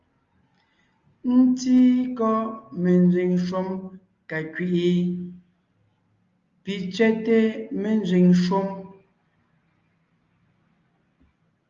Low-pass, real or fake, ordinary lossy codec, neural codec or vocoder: 7.2 kHz; real; Opus, 32 kbps; none